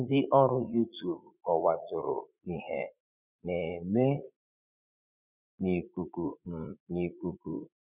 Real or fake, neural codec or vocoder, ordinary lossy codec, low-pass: fake; vocoder, 44.1 kHz, 80 mel bands, Vocos; none; 3.6 kHz